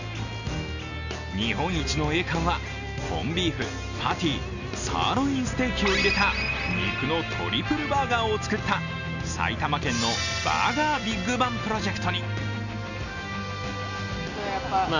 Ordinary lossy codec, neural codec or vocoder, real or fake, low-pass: none; none; real; 7.2 kHz